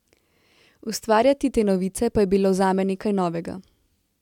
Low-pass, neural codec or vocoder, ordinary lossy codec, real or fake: 19.8 kHz; none; MP3, 96 kbps; real